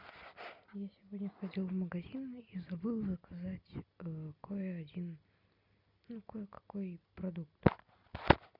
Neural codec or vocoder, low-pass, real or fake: none; 5.4 kHz; real